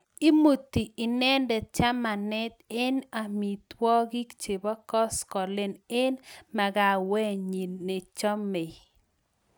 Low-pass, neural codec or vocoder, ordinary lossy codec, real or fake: none; none; none; real